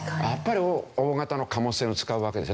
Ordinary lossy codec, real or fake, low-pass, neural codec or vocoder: none; real; none; none